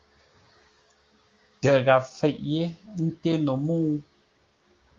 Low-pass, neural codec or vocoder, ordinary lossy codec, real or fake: 7.2 kHz; codec, 16 kHz, 6 kbps, DAC; Opus, 32 kbps; fake